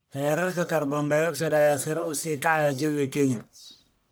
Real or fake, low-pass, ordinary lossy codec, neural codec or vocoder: fake; none; none; codec, 44.1 kHz, 1.7 kbps, Pupu-Codec